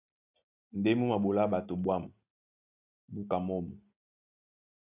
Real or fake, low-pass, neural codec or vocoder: real; 3.6 kHz; none